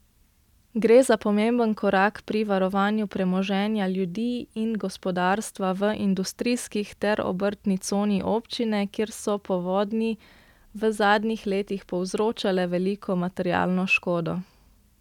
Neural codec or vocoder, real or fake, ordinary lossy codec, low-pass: none; real; none; 19.8 kHz